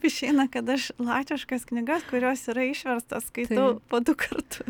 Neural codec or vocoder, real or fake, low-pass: none; real; 19.8 kHz